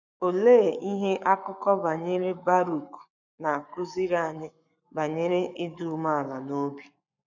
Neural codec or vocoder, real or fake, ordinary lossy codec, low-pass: codec, 44.1 kHz, 7.8 kbps, Pupu-Codec; fake; none; 7.2 kHz